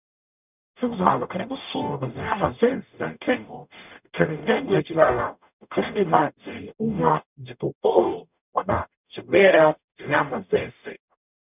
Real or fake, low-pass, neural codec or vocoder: fake; 3.6 kHz; codec, 44.1 kHz, 0.9 kbps, DAC